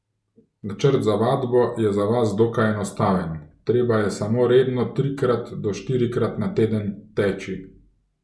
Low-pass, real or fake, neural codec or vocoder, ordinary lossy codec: none; real; none; none